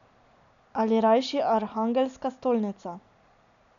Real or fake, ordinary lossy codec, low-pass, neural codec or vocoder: real; none; 7.2 kHz; none